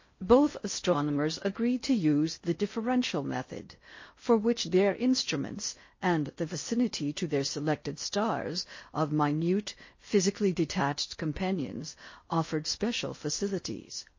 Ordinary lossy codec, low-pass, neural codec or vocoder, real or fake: MP3, 32 kbps; 7.2 kHz; codec, 16 kHz in and 24 kHz out, 0.6 kbps, FocalCodec, streaming, 4096 codes; fake